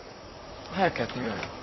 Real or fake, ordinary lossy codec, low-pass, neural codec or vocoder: fake; MP3, 24 kbps; 7.2 kHz; vocoder, 44.1 kHz, 128 mel bands, Pupu-Vocoder